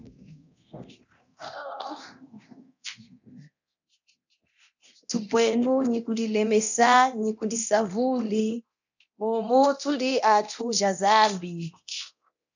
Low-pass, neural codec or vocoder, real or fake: 7.2 kHz; codec, 24 kHz, 0.9 kbps, DualCodec; fake